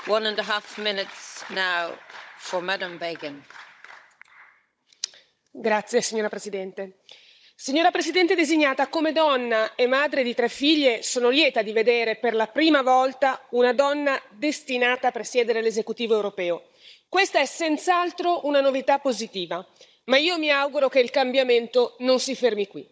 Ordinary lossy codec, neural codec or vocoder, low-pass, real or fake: none; codec, 16 kHz, 16 kbps, FunCodec, trained on Chinese and English, 50 frames a second; none; fake